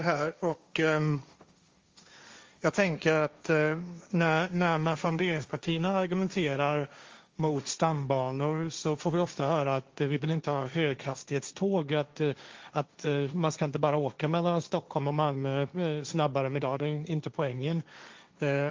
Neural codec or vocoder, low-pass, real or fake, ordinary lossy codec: codec, 16 kHz, 1.1 kbps, Voila-Tokenizer; 7.2 kHz; fake; Opus, 32 kbps